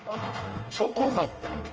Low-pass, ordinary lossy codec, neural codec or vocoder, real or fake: 7.2 kHz; Opus, 24 kbps; codec, 24 kHz, 1 kbps, SNAC; fake